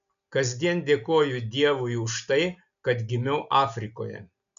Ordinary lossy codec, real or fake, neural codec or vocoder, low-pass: MP3, 96 kbps; real; none; 7.2 kHz